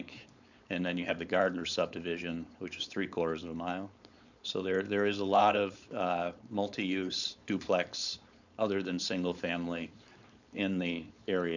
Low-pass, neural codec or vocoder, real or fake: 7.2 kHz; codec, 16 kHz, 4.8 kbps, FACodec; fake